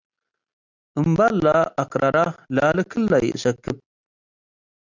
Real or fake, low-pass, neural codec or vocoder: real; 7.2 kHz; none